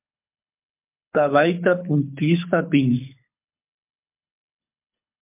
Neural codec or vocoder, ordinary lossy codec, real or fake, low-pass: codec, 24 kHz, 6 kbps, HILCodec; MP3, 32 kbps; fake; 3.6 kHz